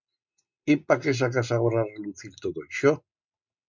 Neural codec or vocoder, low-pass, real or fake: none; 7.2 kHz; real